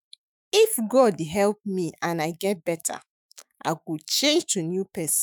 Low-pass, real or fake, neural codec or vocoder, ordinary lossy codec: none; fake; autoencoder, 48 kHz, 128 numbers a frame, DAC-VAE, trained on Japanese speech; none